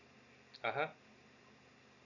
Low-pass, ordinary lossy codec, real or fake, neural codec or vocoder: 7.2 kHz; none; real; none